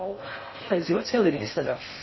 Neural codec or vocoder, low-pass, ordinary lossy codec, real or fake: codec, 16 kHz in and 24 kHz out, 0.6 kbps, FocalCodec, streaming, 4096 codes; 7.2 kHz; MP3, 24 kbps; fake